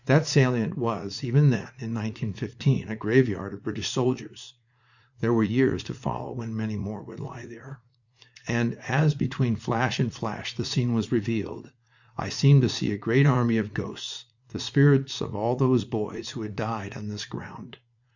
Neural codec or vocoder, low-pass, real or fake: vocoder, 44.1 kHz, 80 mel bands, Vocos; 7.2 kHz; fake